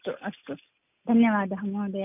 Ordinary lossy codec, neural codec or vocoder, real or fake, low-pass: none; none; real; 3.6 kHz